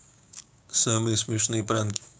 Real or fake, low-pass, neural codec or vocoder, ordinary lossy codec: fake; none; codec, 16 kHz, 6 kbps, DAC; none